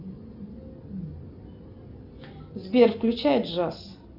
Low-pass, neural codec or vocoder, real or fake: 5.4 kHz; none; real